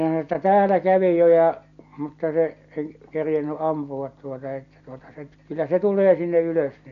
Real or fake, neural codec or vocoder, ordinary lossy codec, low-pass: real; none; none; 7.2 kHz